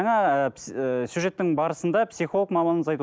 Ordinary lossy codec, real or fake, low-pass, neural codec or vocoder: none; real; none; none